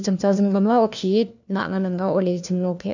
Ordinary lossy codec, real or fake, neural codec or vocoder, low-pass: none; fake; codec, 16 kHz, 1 kbps, FunCodec, trained on LibriTTS, 50 frames a second; 7.2 kHz